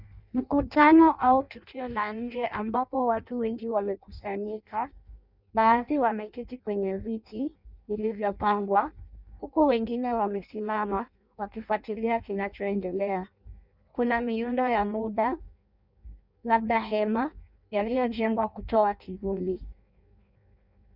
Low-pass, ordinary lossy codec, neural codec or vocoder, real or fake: 5.4 kHz; Opus, 64 kbps; codec, 16 kHz in and 24 kHz out, 0.6 kbps, FireRedTTS-2 codec; fake